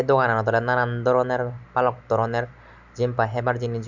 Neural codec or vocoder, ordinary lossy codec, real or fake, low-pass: none; none; real; 7.2 kHz